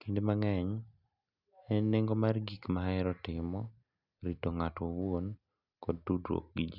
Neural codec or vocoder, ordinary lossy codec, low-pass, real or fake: none; none; 5.4 kHz; real